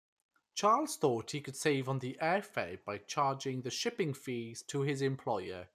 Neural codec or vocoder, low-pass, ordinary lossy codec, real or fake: none; 14.4 kHz; none; real